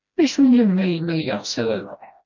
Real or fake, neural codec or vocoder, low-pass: fake; codec, 16 kHz, 1 kbps, FreqCodec, smaller model; 7.2 kHz